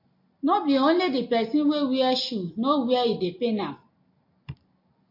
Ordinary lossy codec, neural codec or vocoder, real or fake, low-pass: MP3, 32 kbps; none; real; 5.4 kHz